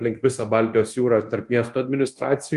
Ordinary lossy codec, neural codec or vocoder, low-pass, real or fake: Opus, 32 kbps; codec, 24 kHz, 0.9 kbps, DualCodec; 10.8 kHz; fake